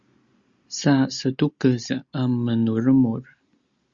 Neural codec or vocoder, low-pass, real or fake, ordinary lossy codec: none; 7.2 kHz; real; Opus, 64 kbps